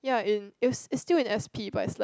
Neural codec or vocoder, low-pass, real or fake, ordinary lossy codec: none; none; real; none